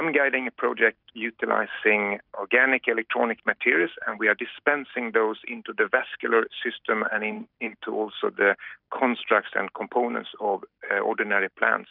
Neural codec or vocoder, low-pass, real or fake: none; 5.4 kHz; real